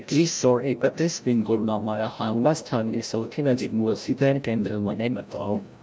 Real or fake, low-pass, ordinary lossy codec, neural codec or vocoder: fake; none; none; codec, 16 kHz, 0.5 kbps, FreqCodec, larger model